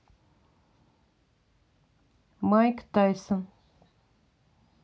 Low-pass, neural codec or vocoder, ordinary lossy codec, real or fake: none; none; none; real